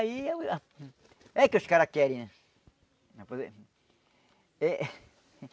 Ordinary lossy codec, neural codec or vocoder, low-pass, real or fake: none; none; none; real